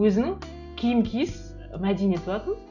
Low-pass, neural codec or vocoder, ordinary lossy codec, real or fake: 7.2 kHz; none; none; real